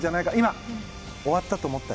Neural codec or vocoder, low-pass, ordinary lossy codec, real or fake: none; none; none; real